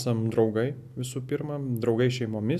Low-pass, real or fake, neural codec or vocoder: 14.4 kHz; real; none